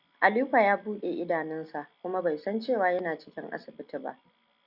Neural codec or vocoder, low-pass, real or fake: none; 5.4 kHz; real